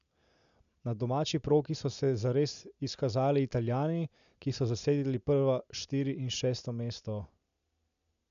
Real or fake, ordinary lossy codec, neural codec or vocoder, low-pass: real; MP3, 96 kbps; none; 7.2 kHz